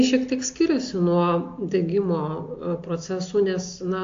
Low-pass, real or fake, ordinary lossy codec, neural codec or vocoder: 7.2 kHz; real; AAC, 48 kbps; none